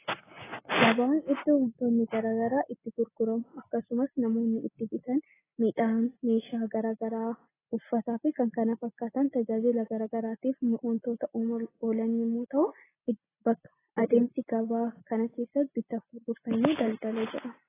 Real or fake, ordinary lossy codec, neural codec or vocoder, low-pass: real; AAC, 16 kbps; none; 3.6 kHz